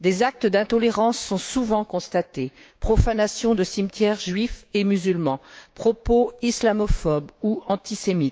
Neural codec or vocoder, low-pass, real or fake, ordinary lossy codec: codec, 16 kHz, 6 kbps, DAC; none; fake; none